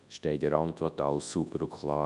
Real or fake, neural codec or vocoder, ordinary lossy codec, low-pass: fake; codec, 24 kHz, 0.9 kbps, WavTokenizer, large speech release; none; 10.8 kHz